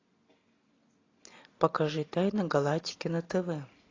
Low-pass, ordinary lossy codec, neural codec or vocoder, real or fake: 7.2 kHz; AAC, 32 kbps; none; real